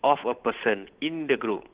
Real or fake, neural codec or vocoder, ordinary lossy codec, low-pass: fake; codec, 16 kHz, 8 kbps, FunCodec, trained on Chinese and English, 25 frames a second; Opus, 16 kbps; 3.6 kHz